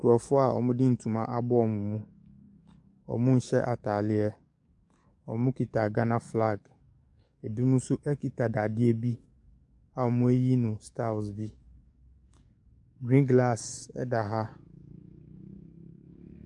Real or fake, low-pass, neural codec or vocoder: fake; 10.8 kHz; codec, 44.1 kHz, 7.8 kbps, DAC